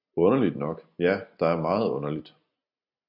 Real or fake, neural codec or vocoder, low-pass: real; none; 5.4 kHz